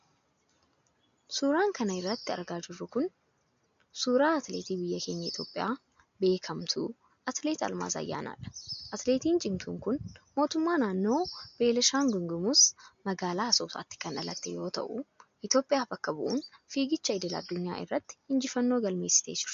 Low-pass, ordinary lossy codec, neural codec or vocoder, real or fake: 7.2 kHz; MP3, 48 kbps; none; real